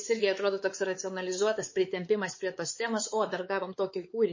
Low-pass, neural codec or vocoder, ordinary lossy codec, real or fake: 7.2 kHz; codec, 16 kHz, 4 kbps, X-Codec, WavLM features, trained on Multilingual LibriSpeech; MP3, 32 kbps; fake